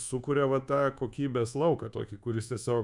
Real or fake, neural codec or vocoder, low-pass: fake; codec, 24 kHz, 1.2 kbps, DualCodec; 10.8 kHz